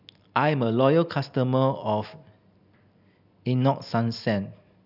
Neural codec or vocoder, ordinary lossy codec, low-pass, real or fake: none; none; 5.4 kHz; real